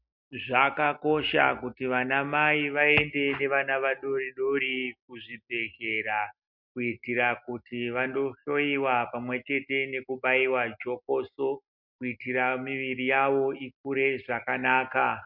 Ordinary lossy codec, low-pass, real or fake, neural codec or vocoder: MP3, 48 kbps; 5.4 kHz; real; none